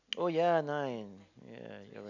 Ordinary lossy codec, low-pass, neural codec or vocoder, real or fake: none; 7.2 kHz; none; real